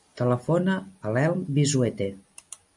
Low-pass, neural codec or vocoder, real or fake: 10.8 kHz; none; real